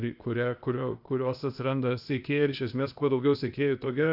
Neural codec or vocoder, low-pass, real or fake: codec, 16 kHz, 0.8 kbps, ZipCodec; 5.4 kHz; fake